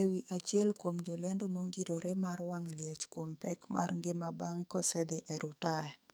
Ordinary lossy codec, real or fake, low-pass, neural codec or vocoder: none; fake; none; codec, 44.1 kHz, 2.6 kbps, SNAC